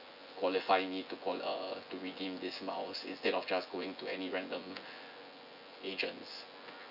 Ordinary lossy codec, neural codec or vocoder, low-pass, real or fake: none; vocoder, 24 kHz, 100 mel bands, Vocos; 5.4 kHz; fake